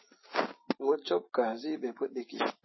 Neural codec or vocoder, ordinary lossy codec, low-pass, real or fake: none; MP3, 24 kbps; 7.2 kHz; real